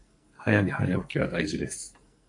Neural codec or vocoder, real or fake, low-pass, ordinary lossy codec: codec, 44.1 kHz, 2.6 kbps, SNAC; fake; 10.8 kHz; MP3, 96 kbps